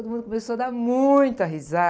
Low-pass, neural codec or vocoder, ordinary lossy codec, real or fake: none; none; none; real